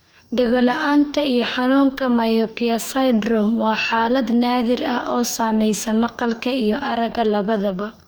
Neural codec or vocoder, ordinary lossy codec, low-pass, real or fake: codec, 44.1 kHz, 2.6 kbps, DAC; none; none; fake